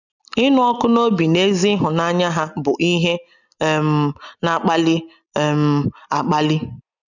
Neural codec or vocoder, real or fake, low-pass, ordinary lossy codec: none; real; 7.2 kHz; none